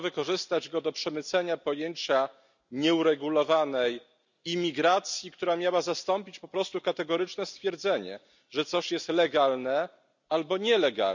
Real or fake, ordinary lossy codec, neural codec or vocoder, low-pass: real; none; none; 7.2 kHz